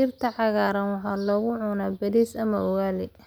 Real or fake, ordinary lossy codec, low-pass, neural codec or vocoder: real; none; none; none